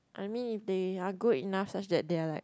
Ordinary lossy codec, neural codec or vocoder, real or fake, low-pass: none; none; real; none